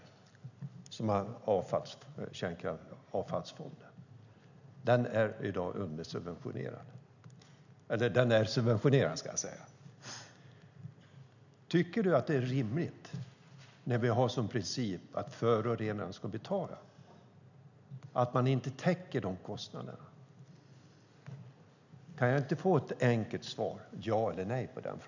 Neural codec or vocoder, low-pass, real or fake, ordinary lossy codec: none; 7.2 kHz; real; none